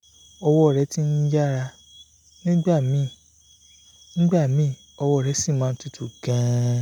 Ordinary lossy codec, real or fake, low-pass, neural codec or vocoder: none; real; 19.8 kHz; none